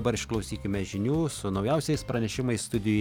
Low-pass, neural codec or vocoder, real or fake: 19.8 kHz; none; real